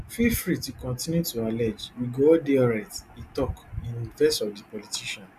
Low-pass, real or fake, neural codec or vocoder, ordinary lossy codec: 14.4 kHz; real; none; none